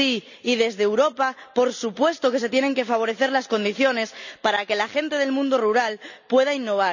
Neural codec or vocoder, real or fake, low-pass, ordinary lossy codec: none; real; 7.2 kHz; none